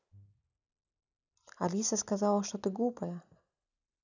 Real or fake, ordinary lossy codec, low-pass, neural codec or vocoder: real; none; 7.2 kHz; none